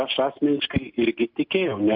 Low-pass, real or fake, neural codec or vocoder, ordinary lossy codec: 5.4 kHz; real; none; MP3, 48 kbps